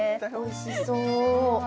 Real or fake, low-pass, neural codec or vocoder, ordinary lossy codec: real; none; none; none